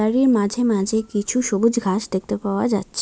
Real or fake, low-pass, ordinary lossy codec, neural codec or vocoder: real; none; none; none